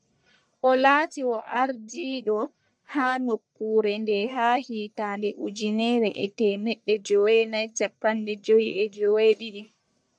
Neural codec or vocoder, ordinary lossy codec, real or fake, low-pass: codec, 44.1 kHz, 1.7 kbps, Pupu-Codec; MP3, 96 kbps; fake; 9.9 kHz